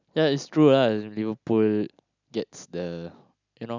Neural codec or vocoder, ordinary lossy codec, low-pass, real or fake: none; none; 7.2 kHz; real